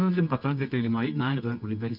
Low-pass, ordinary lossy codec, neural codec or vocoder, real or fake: 5.4 kHz; none; codec, 24 kHz, 0.9 kbps, WavTokenizer, medium music audio release; fake